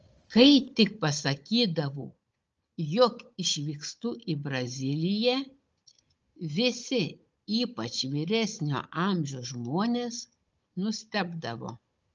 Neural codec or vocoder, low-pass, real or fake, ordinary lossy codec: codec, 16 kHz, 16 kbps, FunCodec, trained on Chinese and English, 50 frames a second; 7.2 kHz; fake; Opus, 24 kbps